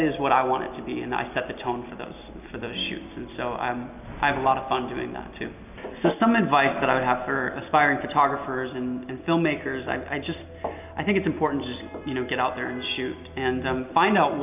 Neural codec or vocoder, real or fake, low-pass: none; real; 3.6 kHz